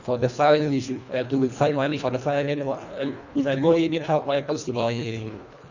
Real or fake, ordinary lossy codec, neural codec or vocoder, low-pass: fake; none; codec, 24 kHz, 1.5 kbps, HILCodec; 7.2 kHz